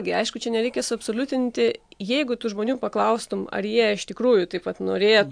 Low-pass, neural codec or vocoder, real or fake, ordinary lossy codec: 9.9 kHz; none; real; AAC, 64 kbps